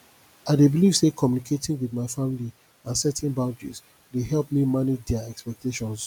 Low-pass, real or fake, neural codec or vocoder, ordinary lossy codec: 19.8 kHz; real; none; none